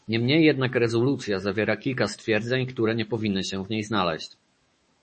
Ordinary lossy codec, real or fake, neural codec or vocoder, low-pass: MP3, 32 kbps; fake; autoencoder, 48 kHz, 128 numbers a frame, DAC-VAE, trained on Japanese speech; 10.8 kHz